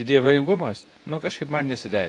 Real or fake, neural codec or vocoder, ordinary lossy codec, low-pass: fake; codec, 24 kHz, 0.9 kbps, WavTokenizer, medium speech release version 2; AAC, 48 kbps; 10.8 kHz